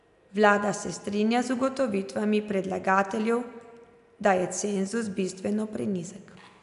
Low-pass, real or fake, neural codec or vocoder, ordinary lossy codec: 10.8 kHz; real; none; none